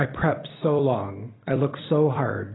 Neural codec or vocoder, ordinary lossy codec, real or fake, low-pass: vocoder, 44.1 kHz, 128 mel bands every 256 samples, BigVGAN v2; AAC, 16 kbps; fake; 7.2 kHz